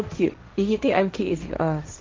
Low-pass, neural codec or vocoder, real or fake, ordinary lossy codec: 7.2 kHz; codec, 16 kHz, 1.1 kbps, Voila-Tokenizer; fake; Opus, 24 kbps